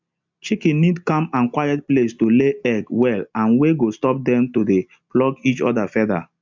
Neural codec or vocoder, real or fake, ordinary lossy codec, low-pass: none; real; MP3, 64 kbps; 7.2 kHz